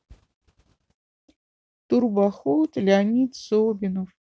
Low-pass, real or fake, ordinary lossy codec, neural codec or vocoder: none; real; none; none